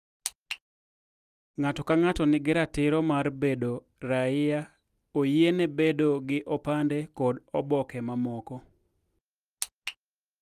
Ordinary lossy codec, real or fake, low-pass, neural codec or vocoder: Opus, 32 kbps; real; 14.4 kHz; none